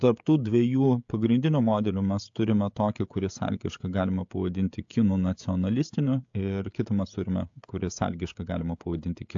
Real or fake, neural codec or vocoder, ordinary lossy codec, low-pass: fake; codec, 16 kHz, 16 kbps, FreqCodec, smaller model; AAC, 64 kbps; 7.2 kHz